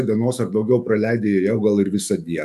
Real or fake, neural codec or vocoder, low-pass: fake; autoencoder, 48 kHz, 128 numbers a frame, DAC-VAE, trained on Japanese speech; 14.4 kHz